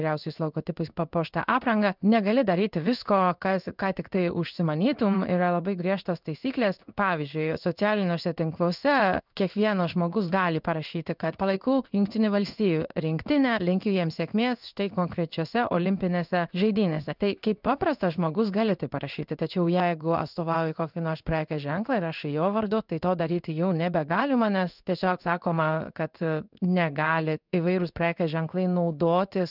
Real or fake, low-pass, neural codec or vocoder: fake; 5.4 kHz; codec, 16 kHz in and 24 kHz out, 1 kbps, XY-Tokenizer